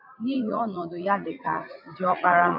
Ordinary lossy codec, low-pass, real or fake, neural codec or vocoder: AAC, 48 kbps; 5.4 kHz; fake; vocoder, 44.1 kHz, 80 mel bands, Vocos